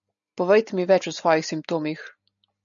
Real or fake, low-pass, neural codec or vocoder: real; 7.2 kHz; none